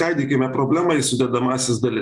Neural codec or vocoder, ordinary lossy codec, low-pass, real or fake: none; Opus, 64 kbps; 10.8 kHz; real